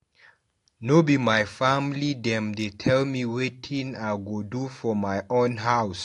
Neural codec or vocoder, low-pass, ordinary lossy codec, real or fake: none; 10.8 kHz; AAC, 48 kbps; real